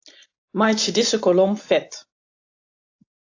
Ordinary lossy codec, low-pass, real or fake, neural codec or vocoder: AAC, 48 kbps; 7.2 kHz; real; none